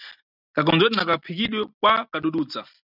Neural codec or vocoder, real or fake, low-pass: none; real; 5.4 kHz